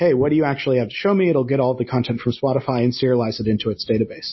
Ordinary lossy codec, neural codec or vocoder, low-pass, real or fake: MP3, 24 kbps; none; 7.2 kHz; real